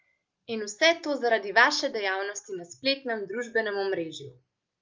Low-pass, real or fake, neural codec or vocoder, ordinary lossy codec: 7.2 kHz; real; none; Opus, 24 kbps